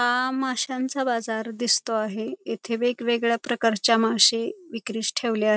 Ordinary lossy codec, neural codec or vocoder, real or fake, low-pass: none; none; real; none